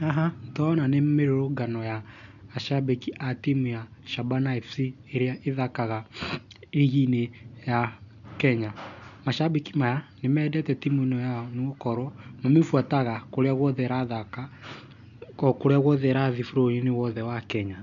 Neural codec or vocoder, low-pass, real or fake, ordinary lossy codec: none; 7.2 kHz; real; none